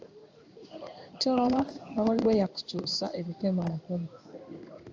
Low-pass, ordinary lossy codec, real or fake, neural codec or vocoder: 7.2 kHz; AAC, 48 kbps; fake; codec, 16 kHz in and 24 kHz out, 1 kbps, XY-Tokenizer